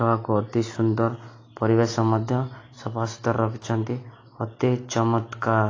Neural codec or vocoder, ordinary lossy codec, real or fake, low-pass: none; AAC, 32 kbps; real; 7.2 kHz